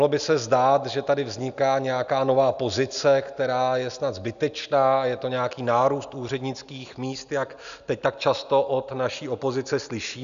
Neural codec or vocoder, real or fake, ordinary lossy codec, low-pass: none; real; MP3, 96 kbps; 7.2 kHz